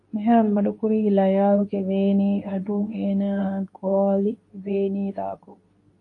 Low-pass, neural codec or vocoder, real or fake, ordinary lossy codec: 10.8 kHz; codec, 24 kHz, 0.9 kbps, WavTokenizer, medium speech release version 2; fake; AAC, 48 kbps